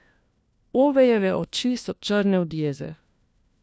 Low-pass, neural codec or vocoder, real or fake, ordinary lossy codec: none; codec, 16 kHz, 1 kbps, FunCodec, trained on LibriTTS, 50 frames a second; fake; none